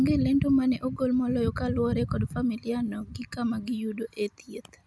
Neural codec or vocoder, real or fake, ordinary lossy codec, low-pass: none; real; none; none